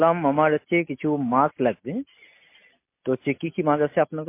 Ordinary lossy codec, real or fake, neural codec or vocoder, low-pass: MP3, 24 kbps; real; none; 3.6 kHz